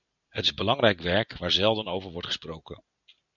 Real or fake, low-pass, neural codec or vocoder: real; 7.2 kHz; none